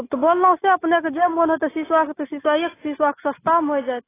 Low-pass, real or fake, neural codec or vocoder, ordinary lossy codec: 3.6 kHz; real; none; AAC, 16 kbps